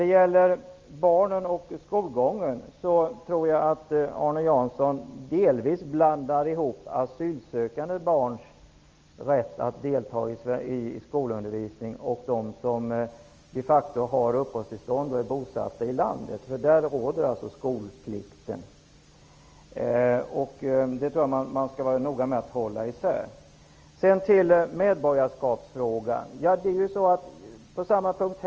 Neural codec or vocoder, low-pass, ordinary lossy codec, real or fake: none; 7.2 kHz; Opus, 24 kbps; real